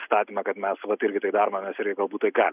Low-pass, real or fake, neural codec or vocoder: 3.6 kHz; real; none